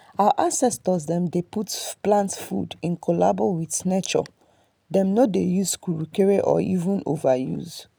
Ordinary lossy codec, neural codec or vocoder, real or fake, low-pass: none; none; real; none